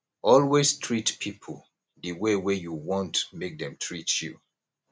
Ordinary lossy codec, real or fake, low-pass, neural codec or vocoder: none; real; none; none